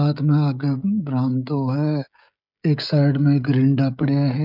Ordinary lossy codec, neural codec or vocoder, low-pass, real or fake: none; codec, 16 kHz, 16 kbps, FreqCodec, smaller model; 5.4 kHz; fake